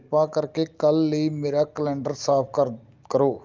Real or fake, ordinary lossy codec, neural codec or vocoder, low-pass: real; Opus, 32 kbps; none; 7.2 kHz